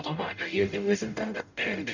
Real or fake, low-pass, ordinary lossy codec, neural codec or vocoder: fake; 7.2 kHz; none; codec, 44.1 kHz, 0.9 kbps, DAC